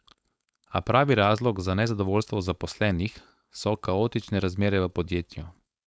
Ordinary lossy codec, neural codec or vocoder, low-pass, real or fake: none; codec, 16 kHz, 4.8 kbps, FACodec; none; fake